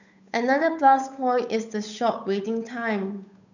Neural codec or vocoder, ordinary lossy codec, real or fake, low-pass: codec, 16 kHz, 8 kbps, FunCodec, trained on Chinese and English, 25 frames a second; none; fake; 7.2 kHz